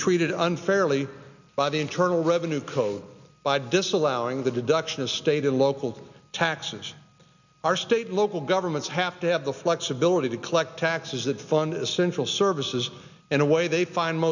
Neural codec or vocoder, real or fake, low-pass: none; real; 7.2 kHz